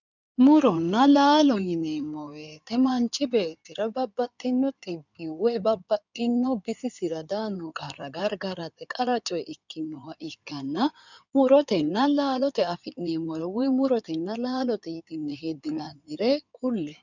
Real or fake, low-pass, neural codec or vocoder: fake; 7.2 kHz; codec, 16 kHz in and 24 kHz out, 2.2 kbps, FireRedTTS-2 codec